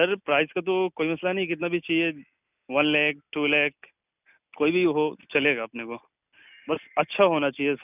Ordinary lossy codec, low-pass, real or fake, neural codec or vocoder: none; 3.6 kHz; real; none